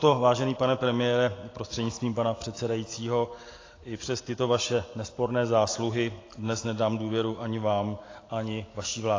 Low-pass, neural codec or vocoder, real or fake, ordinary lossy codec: 7.2 kHz; none; real; AAC, 32 kbps